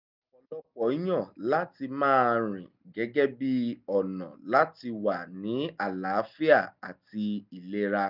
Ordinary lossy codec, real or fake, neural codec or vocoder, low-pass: none; real; none; 5.4 kHz